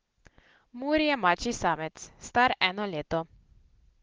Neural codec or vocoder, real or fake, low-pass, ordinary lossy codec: none; real; 7.2 kHz; Opus, 16 kbps